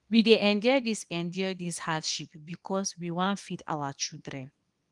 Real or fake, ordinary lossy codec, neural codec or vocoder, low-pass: fake; Opus, 32 kbps; codec, 24 kHz, 0.9 kbps, WavTokenizer, small release; 10.8 kHz